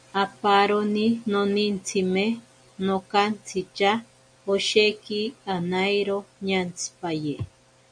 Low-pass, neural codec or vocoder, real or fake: 9.9 kHz; none; real